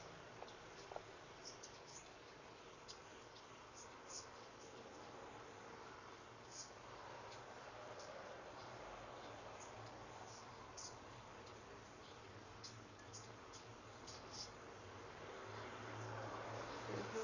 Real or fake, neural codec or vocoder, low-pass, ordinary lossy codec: fake; codec, 44.1 kHz, 7.8 kbps, Pupu-Codec; 7.2 kHz; none